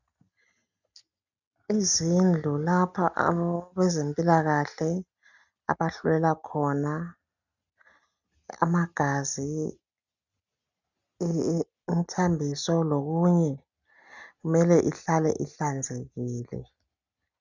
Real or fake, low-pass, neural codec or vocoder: real; 7.2 kHz; none